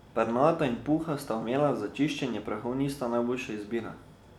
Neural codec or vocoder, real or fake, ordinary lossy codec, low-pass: none; real; none; 19.8 kHz